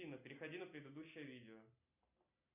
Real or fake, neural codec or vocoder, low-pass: real; none; 3.6 kHz